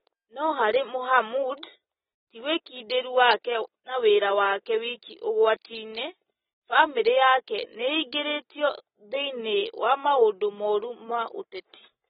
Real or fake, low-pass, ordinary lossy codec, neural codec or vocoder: real; 9.9 kHz; AAC, 16 kbps; none